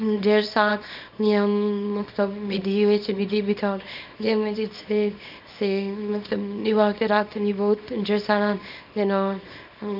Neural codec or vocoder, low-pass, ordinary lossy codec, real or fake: codec, 24 kHz, 0.9 kbps, WavTokenizer, small release; 5.4 kHz; none; fake